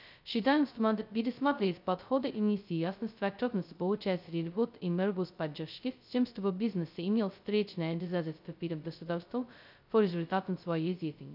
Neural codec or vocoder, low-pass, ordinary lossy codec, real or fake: codec, 16 kHz, 0.2 kbps, FocalCodec; 5.4 kHz; none; fake